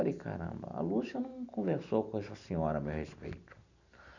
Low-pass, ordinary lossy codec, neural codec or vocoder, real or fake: 7.2 kHz; none; none; real